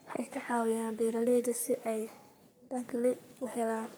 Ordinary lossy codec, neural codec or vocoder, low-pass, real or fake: none; codec, 44.1 kHz, 3.4 kbps, Pupu-Codec; none; fake